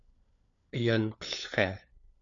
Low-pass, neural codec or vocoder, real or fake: 7.2 kHz; codec, 16 kHz, 4 kbps, FunCodec, trained on LibriTTS, 50 frames a second; fake